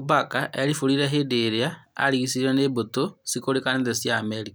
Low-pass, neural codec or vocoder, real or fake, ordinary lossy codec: none; none; real; none